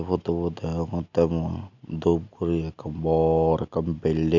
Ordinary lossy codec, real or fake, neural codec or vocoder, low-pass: none; real; none; 7.2 kHz